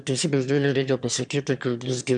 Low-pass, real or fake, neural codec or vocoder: 9.9 kHz; fake; autoencoder, 22.05 kHz, a latent of 192 numbers a frame, VITS, trained on one speaker